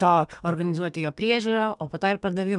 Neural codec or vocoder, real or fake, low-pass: codec, 32 kHz, 1.9 kbps, SNAC; fake; 10.8 kHz